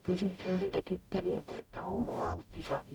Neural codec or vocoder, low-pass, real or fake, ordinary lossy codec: codec, 44.1 kHz, 0.9 kbps, DAC; 19.8 kHz; fake; none